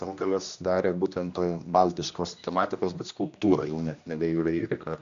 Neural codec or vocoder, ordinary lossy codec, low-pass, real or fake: codec, 16 kHz, 1 kbps, X-Codec, HuBERT features, trained on general audio; AAC, 64 kbps; 7.2 kHz; fake